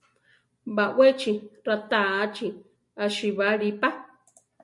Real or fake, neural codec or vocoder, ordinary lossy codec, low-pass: real; none; MP3, 48 kbps; 10.8 kHz